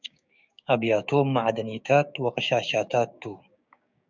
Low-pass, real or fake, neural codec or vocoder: 7.2 kHz; fake; codec, 16 kHz, 6 kbps, DAC